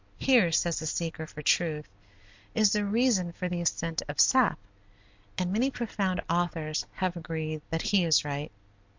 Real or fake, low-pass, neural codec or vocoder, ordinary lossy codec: real; 7.2 kHz; none; MP3, 48 kbps